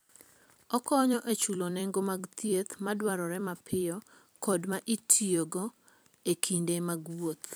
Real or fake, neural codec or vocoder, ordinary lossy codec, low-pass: fake; vocoder, 44.1 kHz, 128 mel bands every 256 samples, BigVGAN v2; none; none